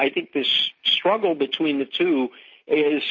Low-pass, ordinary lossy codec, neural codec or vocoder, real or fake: 7.2 kHz; MP3, 32 kbps; none; real